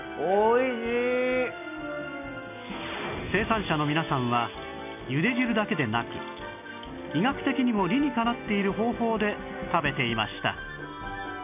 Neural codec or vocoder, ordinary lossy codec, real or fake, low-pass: none; none; real; 3.6 kHz